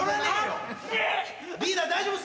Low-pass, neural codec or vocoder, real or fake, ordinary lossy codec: none; none; real; none